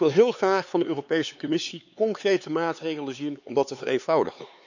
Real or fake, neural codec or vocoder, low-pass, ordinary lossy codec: fake; codec, 16 kHz, 4 kbps, X-Codec, HuBERT features, trained on LibriSpeech; 7.2 kHz; none